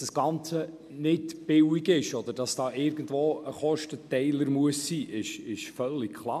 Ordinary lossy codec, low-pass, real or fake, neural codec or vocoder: MP3, 96 kbps; 14.4 kHz; real; none